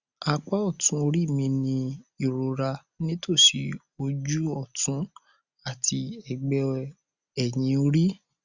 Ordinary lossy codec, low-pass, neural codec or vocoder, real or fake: Opus, 64 kbps; 7.2 kHz; none; real